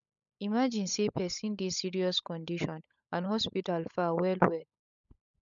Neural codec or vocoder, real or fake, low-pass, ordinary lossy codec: codec, 16 kHz, 16 kbps, FunCodec, trained on LibriTTS, 50 frames a second; fake; 7.2 kHz; none